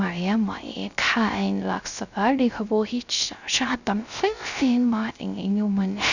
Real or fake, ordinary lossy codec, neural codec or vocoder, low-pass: fake; none; codec, 16 kHz, 0.3 kbps, FocalCodec; 7.2 kHz